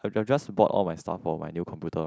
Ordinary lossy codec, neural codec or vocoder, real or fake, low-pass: none; none; real; none